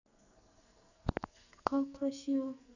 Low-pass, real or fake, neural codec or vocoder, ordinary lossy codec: 7.2 kHz; fake; codec, 44.1 kHz, 2.6 kbps, SNAC; none